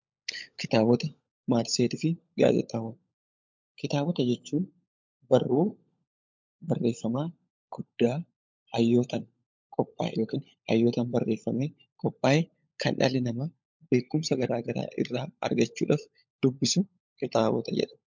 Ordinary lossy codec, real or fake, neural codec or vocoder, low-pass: MP3, 64 kbps; fake; codec, 16 kHz, 16 kbps, FunCodec, trained on LibriTTS, 50 frames a second; 7.2 kHz